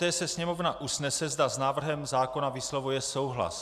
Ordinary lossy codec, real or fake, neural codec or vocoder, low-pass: AAC, 96 kbps; real; none; 14.4 kHz